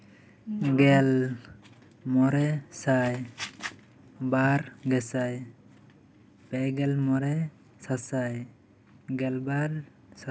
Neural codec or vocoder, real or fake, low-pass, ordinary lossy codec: none; real; none; none